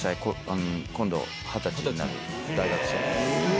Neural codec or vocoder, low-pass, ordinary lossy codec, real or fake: none; none; none; real